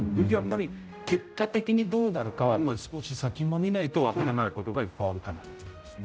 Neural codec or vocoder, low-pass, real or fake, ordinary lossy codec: codec, 16 kHz, 0.5 kbps, X-Codec, HuBERT features, trained on general audio; none; fake; none